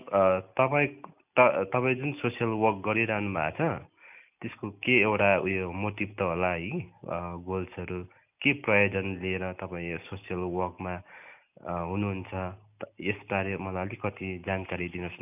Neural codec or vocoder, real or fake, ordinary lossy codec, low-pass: none; real; none; 3.6 kHz